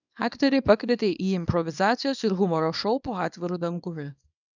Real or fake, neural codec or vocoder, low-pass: fake; codec, 24 kHz, 0.9 kbps, WavTokenizer, small release; 7.2 kHz